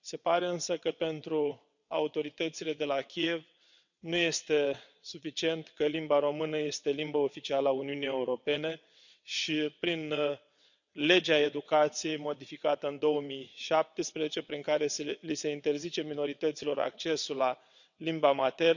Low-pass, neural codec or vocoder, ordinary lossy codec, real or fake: 7.2 kHz; vocoder, 22.05 kHz, 80 mel bands, WaveNeXt; none; fake